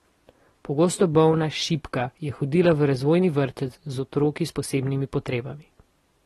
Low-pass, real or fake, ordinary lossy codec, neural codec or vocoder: 19.8 kHz; real; AAC, 32 kbps; none